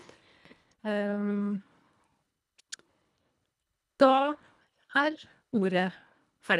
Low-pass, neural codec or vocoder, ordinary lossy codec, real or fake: none; codec, 24 kHz, 1.5 kbps, HILCodec; none; fake